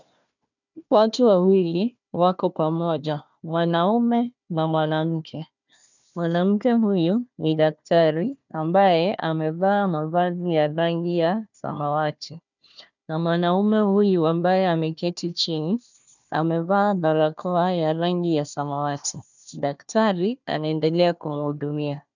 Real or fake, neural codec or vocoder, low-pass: fake; codec, 16 kHz, 1 kbps, FunCodec, trained on Chinese and English, 50 frames a second; 7.2 kHz